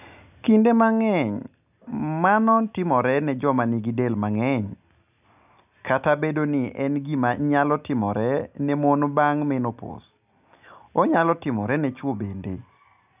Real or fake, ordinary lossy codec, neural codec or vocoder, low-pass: real; none; none; 3.6 kHz